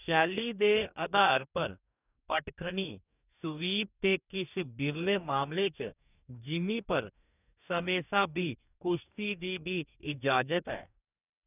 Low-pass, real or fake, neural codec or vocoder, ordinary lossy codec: 3.6 kHz; fake; codec, 44.1 kHz, 2.6 kbps, DAC; none